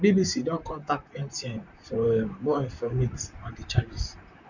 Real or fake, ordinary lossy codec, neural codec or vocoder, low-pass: fake; none; vocoder, 44.1 kHz, 128 mel bands, Pupu-Vocoder; 7.2 kHz